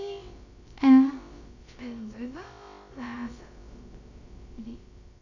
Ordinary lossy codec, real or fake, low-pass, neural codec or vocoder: none; fake; 7.2 kHz; codec, 16 kHz, about 1 kbps, DyCAST, with the encoder's durations